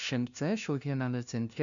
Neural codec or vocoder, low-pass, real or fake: codec, 16 kHz, 0.5 kbps, FunCodec, trained on LibriTTS, 25 frames a second; 7.2 kHz; fake